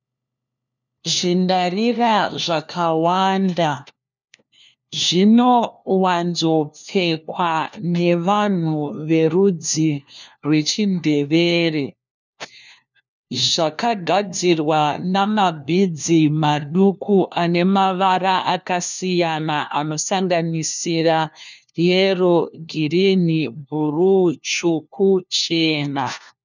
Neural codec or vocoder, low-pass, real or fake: codec, 16 kHz, 1 kbps, FunCodec, trained on LibriTTS, 50 frames a second; 7.2 kHz; fake